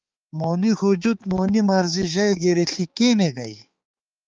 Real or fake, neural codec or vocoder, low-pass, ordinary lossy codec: fake; codec, 16 kHz, 4 kbps, X-Codec, HuBERT features, trained on balanced general audio; 7.2 kHz; Opus, 32 kbps